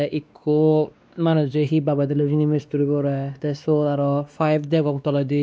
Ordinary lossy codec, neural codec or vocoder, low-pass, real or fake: none; codec, 16 kHz, 2 kbps, X-Codec, WavLM features, trained on Multilingual LibriSpeech; none; fake